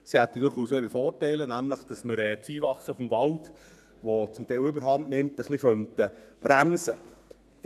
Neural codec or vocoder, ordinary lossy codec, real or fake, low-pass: codec, 32 kHz, 1.9 kbps, SNAC; none; fake; 14.4 kHz